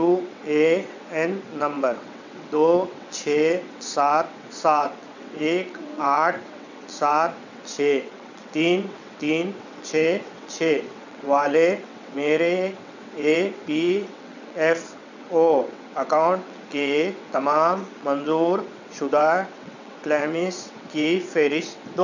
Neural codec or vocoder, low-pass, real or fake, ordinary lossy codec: vocoder, 22.05 kHz, 80 mel bands, WaveNeXt; 7.2 kHz; fake; none